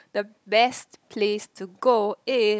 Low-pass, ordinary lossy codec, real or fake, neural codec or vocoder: none; none; fake; codec, 16 kHz, 16 kbps, FunCodec, trained on Chinese and English, 50 frames a second